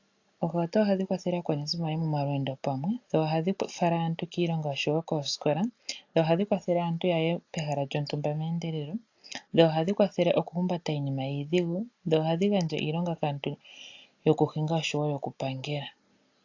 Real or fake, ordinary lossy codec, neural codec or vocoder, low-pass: real; AAC, 48 kbps; none; 7.2 kHz